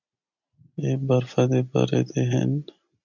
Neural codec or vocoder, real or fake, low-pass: none; real; 7.2 kHz